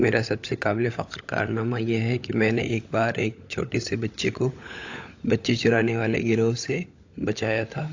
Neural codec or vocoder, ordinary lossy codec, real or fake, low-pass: codec, 16 kHz, 16 kbps, FunCodec, trained on LibriTTS, 50 frames a second; AAC, 48 kbps; fake; 7.2 kHz